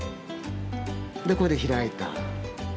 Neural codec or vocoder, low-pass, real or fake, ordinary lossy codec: none; none; real; none